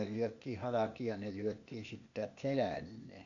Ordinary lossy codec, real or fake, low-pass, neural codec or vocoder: none; fake; 7.2 kHz; codec, 16 kHz, 0.8 kbps, ZipCodec